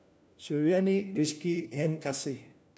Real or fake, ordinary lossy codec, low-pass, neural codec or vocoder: fake; none; none; codec, 16 kHz, 1 kbps, FunCodec, trained on LibriTTS, 50 frames a second